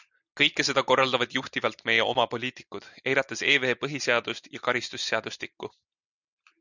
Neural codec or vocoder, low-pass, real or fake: none; 7.2 kHz; real